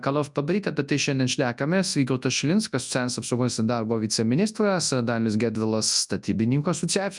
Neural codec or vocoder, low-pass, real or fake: codec, 24 kHz, 0.9 kbps, WavTokenizer, large speech release; 10.8 kHz; fake